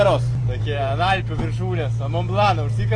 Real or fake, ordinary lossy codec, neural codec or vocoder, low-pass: real; AAC, 32 kbps; none; 9.9 kHz